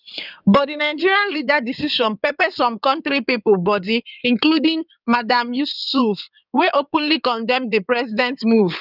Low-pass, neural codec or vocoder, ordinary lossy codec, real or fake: 5.4 kHz; codec, 16 kHz in and 24 kHz out, 2.2 kbps, FireRedTTS-2 codec; none; fake